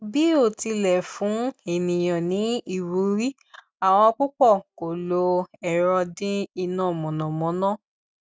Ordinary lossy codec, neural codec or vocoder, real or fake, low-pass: none; none; real; none